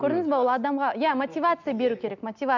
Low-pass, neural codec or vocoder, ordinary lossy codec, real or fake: 7.2 kHz; none; none; real